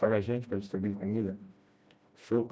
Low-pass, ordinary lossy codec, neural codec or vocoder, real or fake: none; none; codec, 16 kHz, 1 kbps, FreqCodec, smaller model; fake